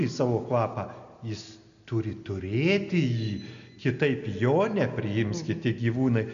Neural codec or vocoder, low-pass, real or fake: none; 7.2 kHz; real